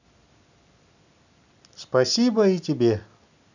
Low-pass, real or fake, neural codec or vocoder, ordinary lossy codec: 7.2 kHz; real; none; none